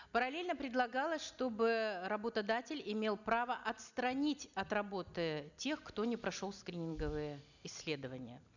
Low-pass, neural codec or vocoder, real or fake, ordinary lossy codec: 7.2 kHz; none; real; none